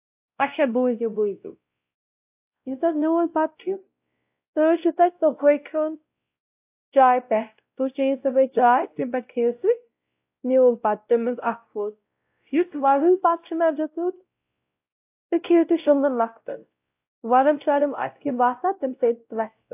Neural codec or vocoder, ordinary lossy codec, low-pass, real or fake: codec, 16 kHz, 0.5 kbps, X-Codec, WavLM features, trained on Multilingual LibriSpeech; AAC, 32 kbps; 3.6 kHz; fake